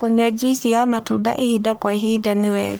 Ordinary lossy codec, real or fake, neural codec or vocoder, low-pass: none; fake; codec, 44.1 kHz, 1.7 kbps, Pupu-Codec; none